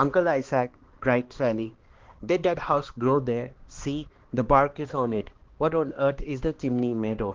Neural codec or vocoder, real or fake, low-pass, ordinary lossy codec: codec, 16 kHz, 2 kbps, X-Codec, HuBERT features, trained on balanced general audio; fake; 7.2 kHz; Opus, 16 kbps